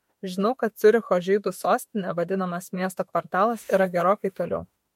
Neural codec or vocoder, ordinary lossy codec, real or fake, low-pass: autoencoder, 48 kHz, 32 numbers a frame, DAC-VAE, trained on Japanese speech; MP3, 64 kbps; fake; 19.8 kHz